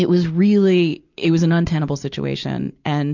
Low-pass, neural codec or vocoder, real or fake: 7.2 kHz; none; real